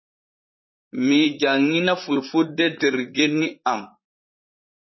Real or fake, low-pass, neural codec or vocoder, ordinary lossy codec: fake; 7.2 kHz; vocoder, 44.1 kHz, 128 mel bands, Pupu-Vocoder; MP3, 24 kbps